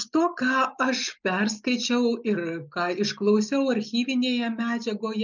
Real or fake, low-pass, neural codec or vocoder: real; 7.2 kHz; none